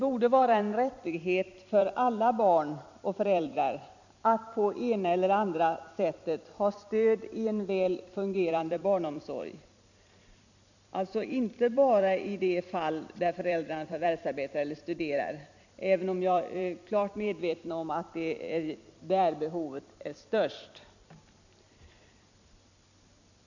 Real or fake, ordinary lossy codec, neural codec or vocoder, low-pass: fake; none; vocoder, 44.1 kHz, 128 mel bands every 256 samples, BigVGAN v2; 7.2 kHz